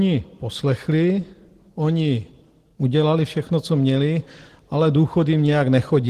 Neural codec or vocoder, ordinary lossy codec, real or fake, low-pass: none; Opus, 16 kbps; real; 14.4 kHz